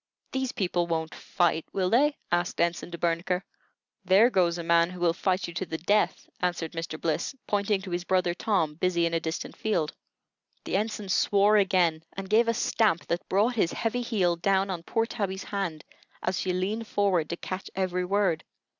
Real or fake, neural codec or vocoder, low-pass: real; none; 7.2 kHz